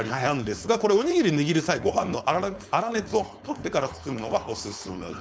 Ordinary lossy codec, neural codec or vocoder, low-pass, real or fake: none; codec, 16 kHz, 4.8 kbps, FACodec; none; fake